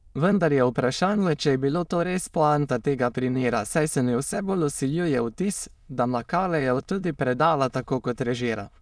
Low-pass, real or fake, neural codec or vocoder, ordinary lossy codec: none; fake; autoencoder, 22.05 kHz, a latent of 192 numbers a frame, VITS, trained on many speakers; none